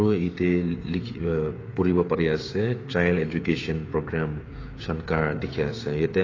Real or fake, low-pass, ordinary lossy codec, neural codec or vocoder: fake; 7.2 kHz; AAC, 32 kbps; codec, 16 kHz, 16 kbps, FreqCodec, smaller model